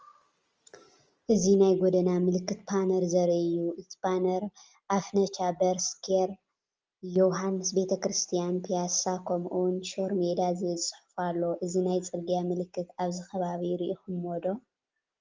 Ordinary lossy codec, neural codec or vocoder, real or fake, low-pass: Opus, 24 kbps; none; real; 7.2 kHz